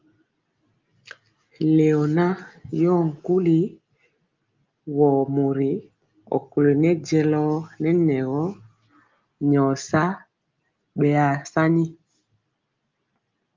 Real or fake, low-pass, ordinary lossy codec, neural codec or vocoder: real; 7.2 kHz; Opus, 32 kbps; none